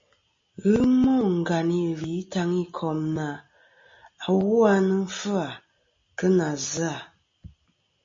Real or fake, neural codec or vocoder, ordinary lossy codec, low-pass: real; none; MP3, 32 kbps; 7.2 kHz